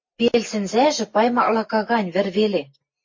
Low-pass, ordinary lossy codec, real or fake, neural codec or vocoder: 7.2 kHz; MP3, 32 kbps; real; none